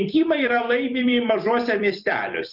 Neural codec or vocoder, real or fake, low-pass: vocoder, 44.1 kHz, 128 mel bands every 256 samples, BigVGAN v2; fake; 5.4 kHz